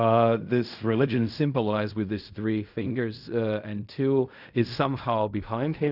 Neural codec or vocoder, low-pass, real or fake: codec, 16 kHz in and 24 kHz out, 0.4 kbps, LongCat-Audio-Codec, fine tuned four codebook decoder; 5.4 kHz; fake